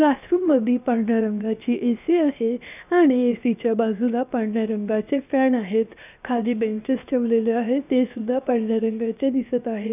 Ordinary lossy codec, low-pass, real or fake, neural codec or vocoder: none; 3.6 kHz; fake; codec, 16 kHz, 0.7 kbps, FocalCodec